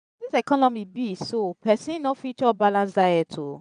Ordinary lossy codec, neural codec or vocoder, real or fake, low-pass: none; vocoder, 22.05 kHz, 80 mel bands, Vocos; fake; 9.9 kHz